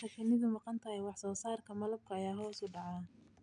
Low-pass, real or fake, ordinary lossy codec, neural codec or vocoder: 9.9 kHz; real; none; none